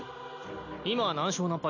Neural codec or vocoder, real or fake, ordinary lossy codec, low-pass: none; real; none; 7.2 kHz